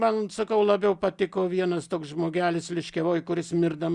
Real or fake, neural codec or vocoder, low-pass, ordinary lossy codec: real; none; 10.8 kHz; Opus, 64 kbps